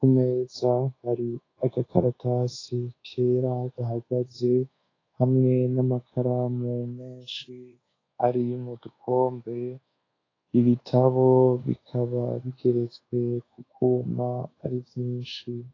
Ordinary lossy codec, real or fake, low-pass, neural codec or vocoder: AAC, 32 kbps; fake; 7.2 kHz; codec, 24 kHz, 1.2 kbps, DualCodec